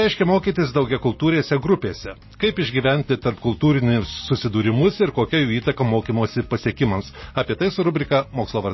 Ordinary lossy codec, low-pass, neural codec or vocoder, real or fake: MP3, 24 kbps; 7.2 kHz; none; real